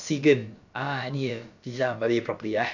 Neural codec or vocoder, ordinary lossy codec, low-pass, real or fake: codec, 16 kHz, about 1 kbps, DyCAST, with the encoder's durations; none; 7.2 kHz; fake